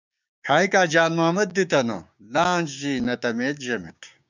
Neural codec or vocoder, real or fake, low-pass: codec, 16 kHz, 6 kbps, DAC; fake; 7.2 kHz